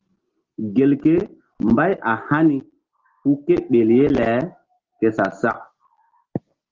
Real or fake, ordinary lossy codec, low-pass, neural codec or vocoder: real; Opus, 16 kbps; 7.2 kHz; none